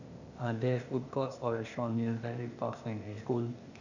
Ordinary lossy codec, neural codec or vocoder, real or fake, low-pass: none; codec, 16 kHz, 0.8 kbps, ZipCodec; fake; 7.2 kHz